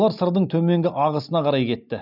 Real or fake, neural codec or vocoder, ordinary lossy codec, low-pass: real; none; none; 5.4 kHz